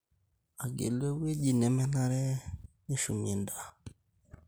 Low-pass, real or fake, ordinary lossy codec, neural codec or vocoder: none; real; none; none